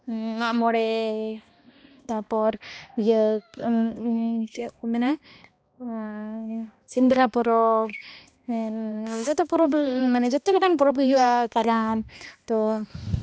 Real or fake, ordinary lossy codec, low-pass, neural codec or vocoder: fake; none; none; codec, 16 kHz, 1 kbps, X-Codec, HuBERT features, trained on balanced general audio